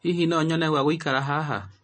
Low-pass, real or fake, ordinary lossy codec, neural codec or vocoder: 9.9 kHz; real; MP3, 32 kbps; none